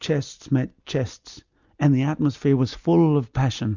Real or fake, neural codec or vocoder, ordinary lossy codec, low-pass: real; none; Opus, 64 kbps; 7.2 kHz